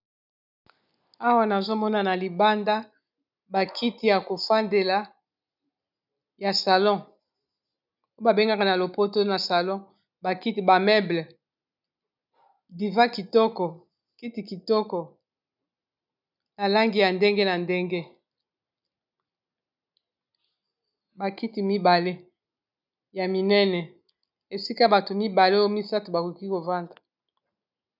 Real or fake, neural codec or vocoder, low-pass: real; none; 5.4 kHz